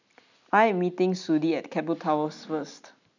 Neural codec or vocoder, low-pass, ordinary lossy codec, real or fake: none; 7.2 kHz; none; real